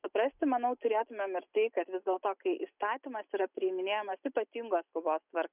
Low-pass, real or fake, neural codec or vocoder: 3.6 kHz; real; none